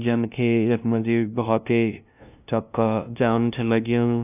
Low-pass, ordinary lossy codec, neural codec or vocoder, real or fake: 3.6 kHz; none; codec, 16 kHz, 0.5 kbps, FunCodec, trained on LibriTTS, 25 frames a second; fake